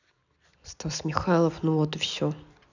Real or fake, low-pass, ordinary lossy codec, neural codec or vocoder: real; 7.2 kHz; none; none